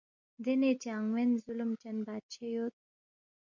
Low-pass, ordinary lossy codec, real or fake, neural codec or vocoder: 7.2 kHz; MP3, 48 kbps; real; none